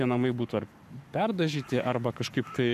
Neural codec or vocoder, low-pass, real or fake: codec, 44.1 kHz, 7.8 kbps, Pupu-Codec; 14.4 kHz; fake